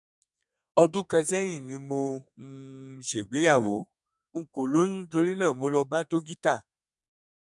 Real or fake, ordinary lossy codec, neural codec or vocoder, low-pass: fake; none; codec, 32 kHz, 1.9 kbps, SNAC; 10.8 kHz